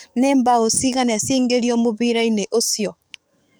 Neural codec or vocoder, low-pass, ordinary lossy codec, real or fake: codec, 44.1 kHz, 7.8 kbps, DAC; none; none; fake